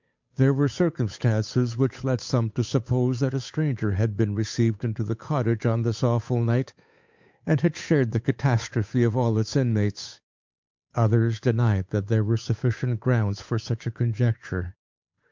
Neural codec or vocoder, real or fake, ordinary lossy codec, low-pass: codec, 16 kHz, 8 kbps, FunCodec, trained on Chinese and English, 25 frames a second; fake; MP3, 64 kbps; 7.2 kHz